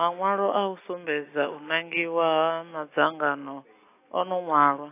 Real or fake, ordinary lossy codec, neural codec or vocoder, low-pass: real; AAC, 24 kbps; none; 3.6 kHz